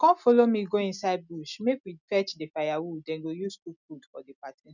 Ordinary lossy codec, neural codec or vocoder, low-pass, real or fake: none; none; 7.2 kHz; real